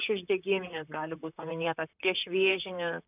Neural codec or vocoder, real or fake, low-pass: vocoder, 44.1 kHz, 128 mel bands, Pupu-Vocoder; fake; 3.6 kHz